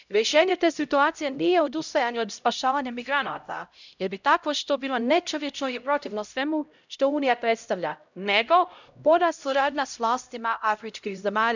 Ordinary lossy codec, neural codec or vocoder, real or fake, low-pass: none; codec, 16 kHz, 0.5 kbps, X-Codec, HuBERT features, trained on LibriSpeech; fake; 7.2 kHz